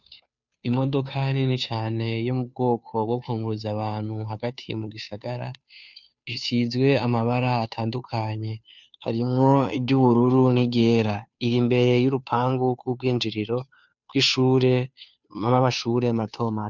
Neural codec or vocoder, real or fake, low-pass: codec, 16 kHz, 2 kbps, FunCodec, trained on Chinese and English, 25 frames a second; fake; 7.2 kHz